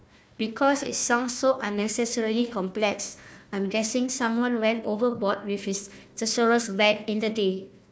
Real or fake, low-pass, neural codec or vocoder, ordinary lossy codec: fake; none; codec, 16 kHz, 1 kbps, FunCodec, trained on Chinese and English, 50 frames a second; none